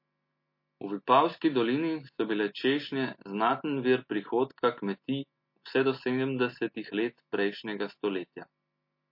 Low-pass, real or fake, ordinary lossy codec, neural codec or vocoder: 5.4 kHz; real; MP3, 32 kbps; none